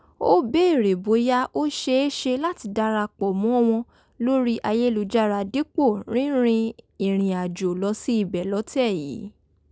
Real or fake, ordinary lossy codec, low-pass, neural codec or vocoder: real; none; none; none